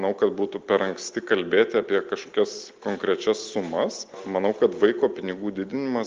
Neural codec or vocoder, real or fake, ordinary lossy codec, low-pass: none; real; Opus, 32 kbps; 7.2 kHz